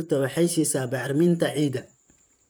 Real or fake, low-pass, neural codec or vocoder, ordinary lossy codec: fake; none; vocoder, 44.1 kHz, 128 mel bands, Pupu-Vocoder; none